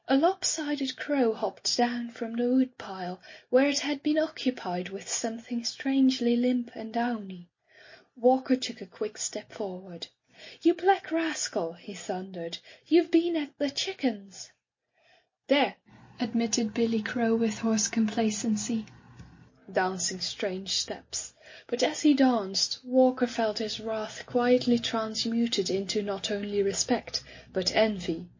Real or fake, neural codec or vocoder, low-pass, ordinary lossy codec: real; none; 7.2 kHz; MP3, 32 kbps